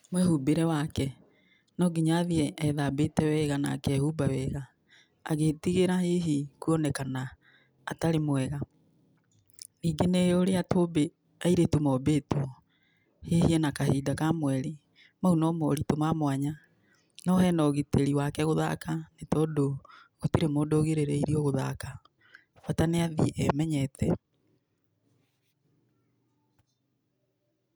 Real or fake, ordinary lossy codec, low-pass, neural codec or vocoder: real; none; none; none